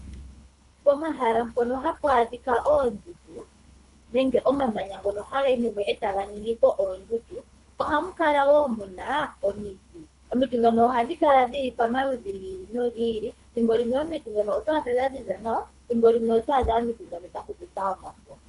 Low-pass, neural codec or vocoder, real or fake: 10.8 kHz; codec, 24 kHz, 3 kbps, HILCodec; fake